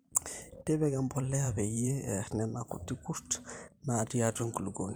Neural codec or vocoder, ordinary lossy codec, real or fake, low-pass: vocoder, 44.1 kHz, 128 mel bands every 512 samples, BigVGAN v2; none; fake; none